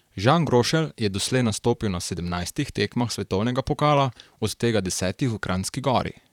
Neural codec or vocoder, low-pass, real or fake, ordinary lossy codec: vocoder, 44.1 kHz, 128 mel bands, Pupu-Vocoder; 19.8 kHz; fake; none